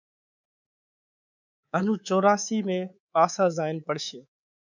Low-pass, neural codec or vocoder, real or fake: 7.2 kHz; codec, 24 kHz, 3.1 kbps, DualCodec; fake